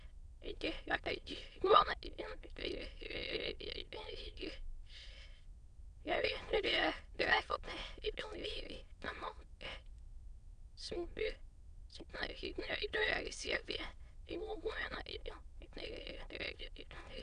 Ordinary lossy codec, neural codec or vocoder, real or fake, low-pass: Opus, 64 kbps; autoencoder, 22.05 kHz, a latent of 192 numbers a frame, VITS, trained on many speakers; fake; 9.9 kHz